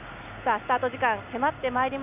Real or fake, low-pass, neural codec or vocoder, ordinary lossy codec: real; 3.6 kHz; none; none